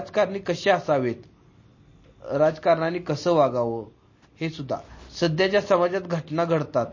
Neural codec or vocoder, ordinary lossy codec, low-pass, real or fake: none; MP3, 32 kbps; 7.2 kHz; real